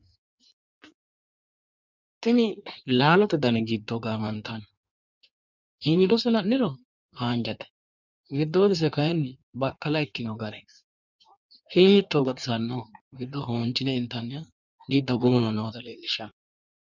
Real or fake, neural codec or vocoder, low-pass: fake; codec, 16 kHz in and 24 kHz out, 1.1 kbps, FireRedTTS-2 codec; 7.2 kHz